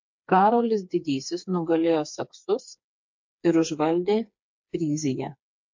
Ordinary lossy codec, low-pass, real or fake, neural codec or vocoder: MP3, 48 kbps; 7.2 kHz; fake; codec, 16 kHz, 4 kbps, FreqCodec, smaller model